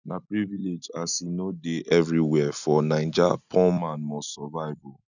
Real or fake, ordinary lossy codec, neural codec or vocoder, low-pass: real; none; none; 7.2 kHz